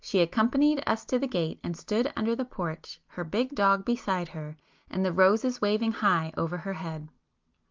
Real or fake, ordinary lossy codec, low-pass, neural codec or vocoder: real; Opus, 32 kbps; 7.2 kHz; none